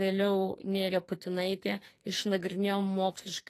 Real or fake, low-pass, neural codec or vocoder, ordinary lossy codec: fake; 14.4 kHz; codec, 44.1 kHz, 2.6 kbps, SNAC; AAC, 48 kbps